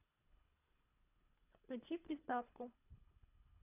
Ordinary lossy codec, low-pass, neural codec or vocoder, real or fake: none; 3.6 kHz; codec, 24 kHz, 3 kbps, HILCodec; fake